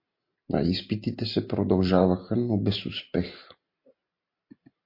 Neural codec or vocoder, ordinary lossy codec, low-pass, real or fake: none; MP3, 32 kbps; 5.4 kHz; real